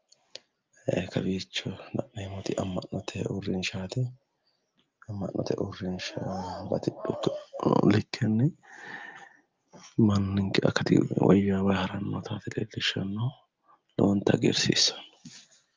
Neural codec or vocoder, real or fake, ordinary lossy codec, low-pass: none; real; Opus, 24 kbps; 7.2 kHz